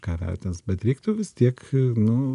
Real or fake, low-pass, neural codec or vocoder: real; 10.8 kHz; none